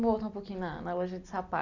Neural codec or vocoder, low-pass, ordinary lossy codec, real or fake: none; 7.2 kHz; none; real